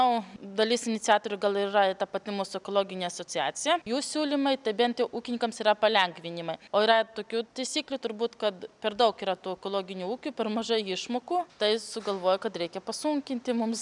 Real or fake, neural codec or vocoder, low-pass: real; none; 10.8 kHz